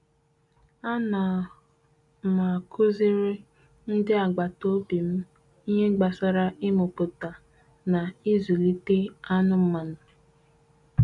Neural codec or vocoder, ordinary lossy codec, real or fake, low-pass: none; none; real; 10.8 kHz